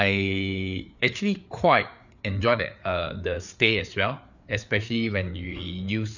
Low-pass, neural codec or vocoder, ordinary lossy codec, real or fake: 7.2 kHz; codec, 16 kHz, 4 kbps, FreqCodec, larger model; none; fake